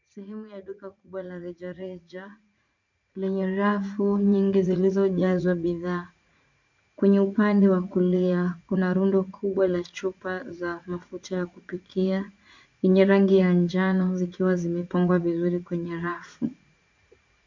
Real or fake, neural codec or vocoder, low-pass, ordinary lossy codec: fake; vocoder, 44.1 kHz, 80 mel bands, Vocos; 7.2 kHz; MP3, 64 kbps